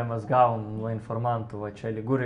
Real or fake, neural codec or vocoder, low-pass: real; none; 9.9 kHz